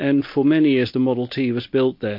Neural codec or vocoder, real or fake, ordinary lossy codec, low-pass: none; real; MP3, 32 kbps; 5.4 kHz